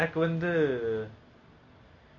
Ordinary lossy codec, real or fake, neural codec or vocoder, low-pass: none; real; none; 7.2 kHz